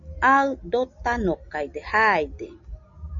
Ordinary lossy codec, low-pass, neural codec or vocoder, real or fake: MP3, 96 kbps; 7.2 kHz; none; real